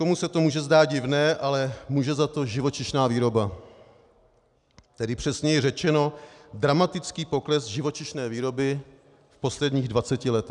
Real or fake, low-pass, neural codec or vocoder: real; 10.8 kHz; none